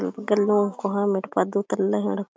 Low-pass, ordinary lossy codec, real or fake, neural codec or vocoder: none; none; real; none